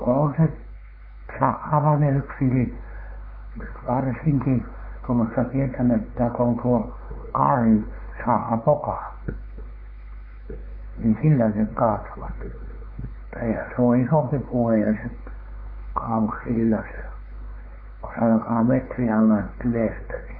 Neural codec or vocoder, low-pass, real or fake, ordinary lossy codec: codec, 16 kHz, 4 kbps, X-Codec, WavLM features, trained on Multilingual LibriSpeech; 5.4 kHz; fake; MP3, 24 kbps